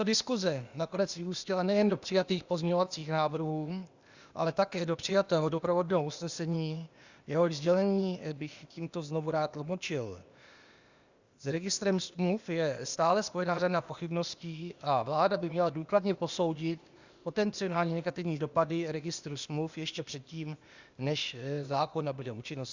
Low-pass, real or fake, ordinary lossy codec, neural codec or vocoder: 7.2 kHz; fake; Opus, 64 kbps; codec, 16 kHz, 0.8 kbps, ZipCodec